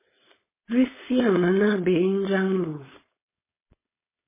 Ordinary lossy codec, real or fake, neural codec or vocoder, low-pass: MP3, 16 kbps; fake; codec, 16 kHz, 4.8 kbps, FACodec; 3.6 kHz